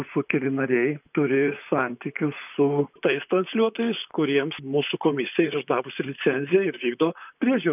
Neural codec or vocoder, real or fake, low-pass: vocoder, 44.1 kHz, 128 mel bands, Pupu-Vocoder; fake; 3.6 kHz